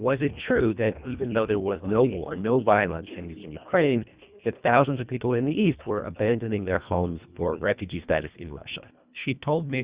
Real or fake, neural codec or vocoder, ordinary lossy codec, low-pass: fake; codec, 24 kHz, 1.5 kbps, HILCodec; Opus, 64 kbps; 3.6 kHz